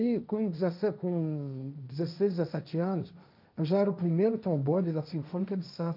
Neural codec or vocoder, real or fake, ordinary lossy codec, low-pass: codec, 16 kHz, 1.1 kbps, Voila-Tokenizer; fake; none; 5.4 kHz